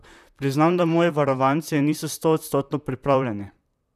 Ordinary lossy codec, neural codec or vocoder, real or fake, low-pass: none; vocoder, 44.1 kHz, 128 mel bands, Pupu-Vocoder; fake; 14.4 kHz